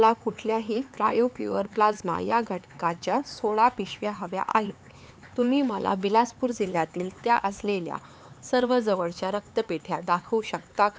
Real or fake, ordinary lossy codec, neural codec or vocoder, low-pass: fake; none; codec, 16 kHz, 4 kbps, X-Codec, HuBERT features, trained on LibriSpeech; none